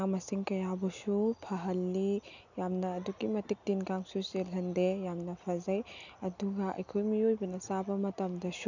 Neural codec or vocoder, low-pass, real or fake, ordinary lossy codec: none; 7.2 kHz; real; none